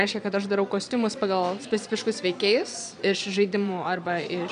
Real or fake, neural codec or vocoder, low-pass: fake; vocoder, 22.05 kHz, 80 mel bands, Vocos; 9.9 kHz